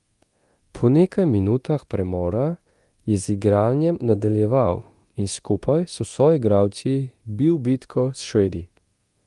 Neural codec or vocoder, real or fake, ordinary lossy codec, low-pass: codec, 24 kHz, 0.9 kbps, DualCodec; fake; Opus, 24 kbps; 10.8 kHz